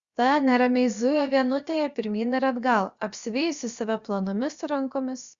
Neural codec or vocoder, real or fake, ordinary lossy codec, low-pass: codec, 16 kHz, about 1 kbps, DyCAST, with the encoder's durations; fake; Opus, 64 kbps; 7.2 kHz